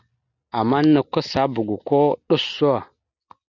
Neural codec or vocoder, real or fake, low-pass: none; real; 7.2 kHz